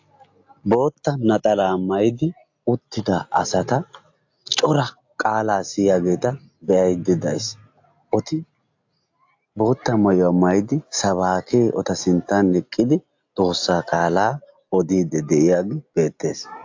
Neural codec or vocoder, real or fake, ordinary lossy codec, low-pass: none; real; AAC, 48 kbps; 7.2 kHz